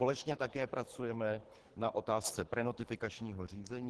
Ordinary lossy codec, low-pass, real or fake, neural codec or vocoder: Opus, 16 kbps; 10.8 kHz; fake; codec, 24 kHz, 3 kbps, HILCodec